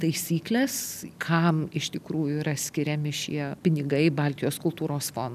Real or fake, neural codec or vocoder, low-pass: real; none; 14.4 kHz